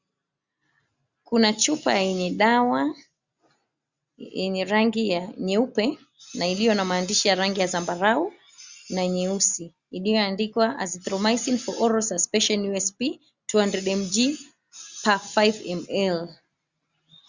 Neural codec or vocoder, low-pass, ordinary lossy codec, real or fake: none; 7.2 kHz; Opus, 64 kbps; real